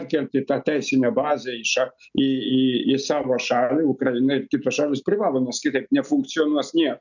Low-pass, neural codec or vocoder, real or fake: 7.2 kHz; none; real